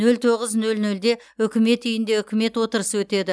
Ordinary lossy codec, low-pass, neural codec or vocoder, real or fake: none; none; none; real